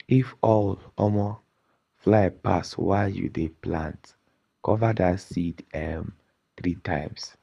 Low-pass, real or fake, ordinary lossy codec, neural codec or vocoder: none; fake; none; codec, 24 kHz, 6 kbps, HILCodec